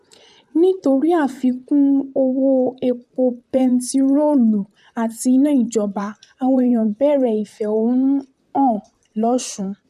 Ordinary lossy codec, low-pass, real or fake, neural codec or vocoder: none; 14.4 kHz; fake; vocoder, 44.1 kHz, 128 mel bands, Pupu-Vocoder